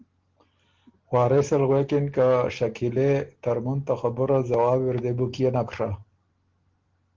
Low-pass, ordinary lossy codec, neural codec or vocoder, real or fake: 7.2 kHz; Opus, 16 kbps; none; real